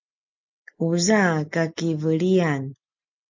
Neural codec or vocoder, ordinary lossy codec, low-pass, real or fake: none; MP3, 48 kbps; 7.2 kHz; real